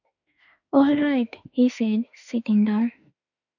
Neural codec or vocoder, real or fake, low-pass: autoencoder, 48 kHz, 32 numbers a frame, DAC-VAE, trained on Japanese speech; fake; 7.2 kHz